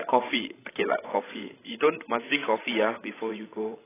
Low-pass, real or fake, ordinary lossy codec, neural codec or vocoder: 3.6 kHz; fake; AAC, 16 kbps; codec, 16 kHz, 16 kbps, FreqCodec, larger model